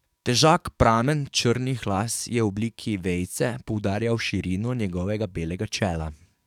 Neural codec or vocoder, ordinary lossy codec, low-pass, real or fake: codec, 44.1 kHz, 7.8 kbps, DAC; none; 19.8 kHz; fake